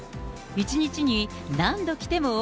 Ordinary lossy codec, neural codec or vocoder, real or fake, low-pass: none; none; real; none